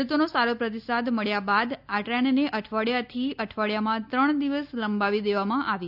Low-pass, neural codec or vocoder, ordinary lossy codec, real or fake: 5.4 kHz; none; none; real